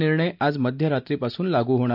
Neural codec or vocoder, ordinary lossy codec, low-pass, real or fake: none; none; 5.4 kHz; real